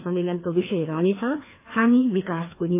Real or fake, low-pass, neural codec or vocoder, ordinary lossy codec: fake; 3.6 kHz; codec, 16 kHz, 2 kbps, FreqCodec, larger model; AAC, 24 kbps